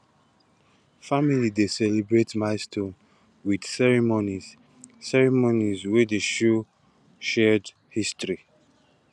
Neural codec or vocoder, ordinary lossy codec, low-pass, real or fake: none; none; none; real